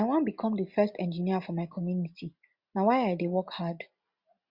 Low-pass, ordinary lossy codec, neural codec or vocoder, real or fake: 5.4 kHz; Opus, 64 kbps; none; real